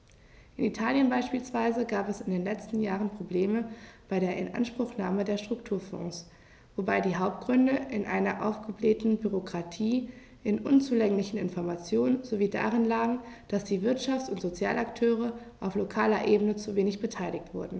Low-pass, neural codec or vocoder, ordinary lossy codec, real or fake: none; none; none; real